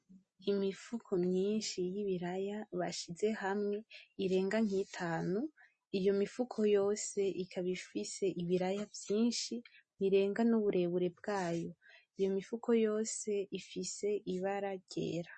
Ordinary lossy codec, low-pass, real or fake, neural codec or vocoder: MP3, 32 kbps; 9.9 kHz; real; none